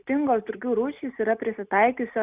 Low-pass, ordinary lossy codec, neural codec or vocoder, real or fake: 3.6 kHz; Opus, 64 kbps; none; real